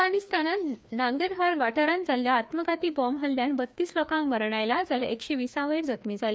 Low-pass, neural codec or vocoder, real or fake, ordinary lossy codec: none; codec, 16 kHz, 2 kbps, FreqCodec, larger model; fake; none